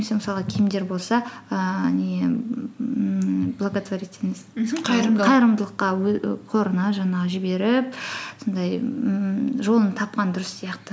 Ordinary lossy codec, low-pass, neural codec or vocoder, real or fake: none; none; none; real